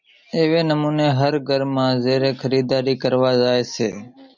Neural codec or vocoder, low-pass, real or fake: none; 7.2 kHz; real